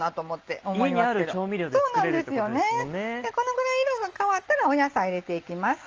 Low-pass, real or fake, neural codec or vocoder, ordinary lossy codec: 7.2 kHz; real; none; Opus, 24 kbps